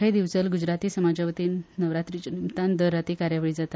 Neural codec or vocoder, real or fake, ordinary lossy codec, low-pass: none; real; none; none